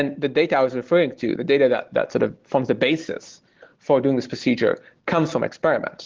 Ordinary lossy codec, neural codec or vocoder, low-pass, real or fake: Opus, 16 kbps; none; 7.2 kHz; real